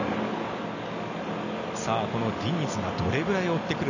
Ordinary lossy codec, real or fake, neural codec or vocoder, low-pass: none; real; none; 7.2 kHz